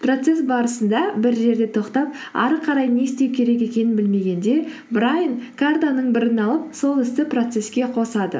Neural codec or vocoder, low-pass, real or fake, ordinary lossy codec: none; none; real; none